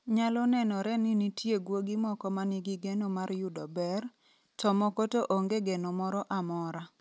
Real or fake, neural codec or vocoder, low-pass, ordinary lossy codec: real; none; none; none